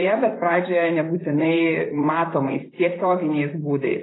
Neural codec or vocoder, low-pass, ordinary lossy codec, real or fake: vocoder, 44.1 kHz, 128 mel bands, Pupu-Vocoder; 7.2 kHz; AAC, 16 kbps; fake